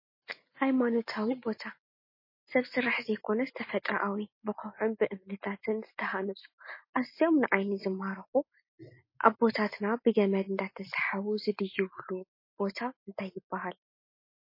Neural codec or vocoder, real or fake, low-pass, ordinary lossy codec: none; real; 5.4 kHz; MP3, 24 kbps